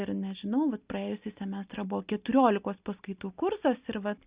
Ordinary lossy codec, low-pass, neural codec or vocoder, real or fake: Opus, 64 kbps; 3.6 kHz; none; real